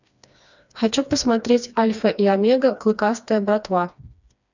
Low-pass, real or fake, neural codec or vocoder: 7.2 kHz; fake; codec, 16 kHz, 2 kbps, FreqCodec, smaller model